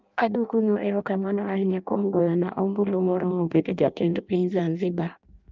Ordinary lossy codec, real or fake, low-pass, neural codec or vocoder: Opus, 24 kbps; fake; 7.2 kHz; codec, 16 kHz in and 24 kHz out, 0.6 kbps, FireRedTTS-2 codec